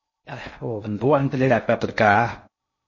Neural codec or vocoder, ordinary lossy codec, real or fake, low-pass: codec, 16 kHz in and 24 kHz out, 0.6 kbps, FocalCodec, streaming, 2048 codes; MP3, 32 kbps; fake; 7.2 kHz